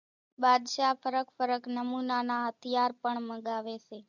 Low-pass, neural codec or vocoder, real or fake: 7.2 kHz; none; real